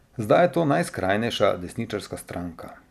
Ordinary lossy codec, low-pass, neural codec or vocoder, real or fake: none; 14.4 kHz; none; real